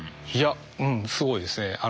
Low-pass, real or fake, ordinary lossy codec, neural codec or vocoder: none; real; none; none